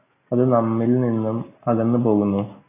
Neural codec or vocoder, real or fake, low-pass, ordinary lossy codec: none; real; 3.6 kHz; AAC, 16 kbps